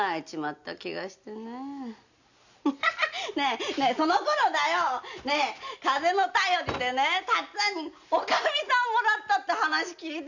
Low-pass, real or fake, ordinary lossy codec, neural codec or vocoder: 7.2 kHz; real; none; none